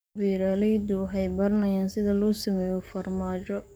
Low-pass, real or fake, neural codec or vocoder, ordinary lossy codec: none; fake; codec, 44.1 kHz, 7.8 kbps, DAC; none